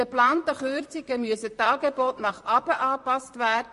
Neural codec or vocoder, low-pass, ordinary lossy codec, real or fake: vocoder, 44.1 kHz, 128 mel bands, Pupu-Vocoder; 14.4 kHz; MP3, 48 kbps; fake